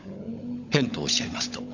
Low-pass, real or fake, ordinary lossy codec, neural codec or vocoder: 7.2 kHz; fake; Opus, 64 kbps; codec, 16 kHz, 16 kbps, FunCodec, trained on LibriTTS, 50 frames a second